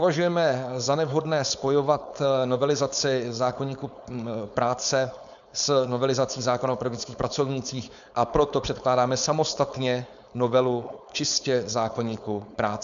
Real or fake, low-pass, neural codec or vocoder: fake; 7.2 kHz; codec, 16 kHz, 4.8 kbps, FACodec